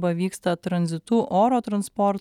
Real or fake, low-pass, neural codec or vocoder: real; 19.8 kHz; none